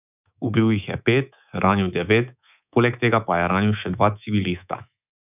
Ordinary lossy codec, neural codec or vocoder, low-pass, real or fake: none; codec, 24 kHz, 3.1 kbps, DualCodec; 3.6 kHz; fake